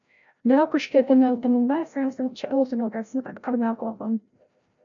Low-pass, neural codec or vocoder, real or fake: 7.2 kHz; codec, 16 kHz, 0.5 kbps, FreqCodec, larger model; fake